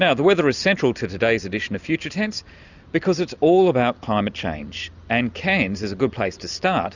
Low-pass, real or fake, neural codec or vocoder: 7.2 kHz; real; none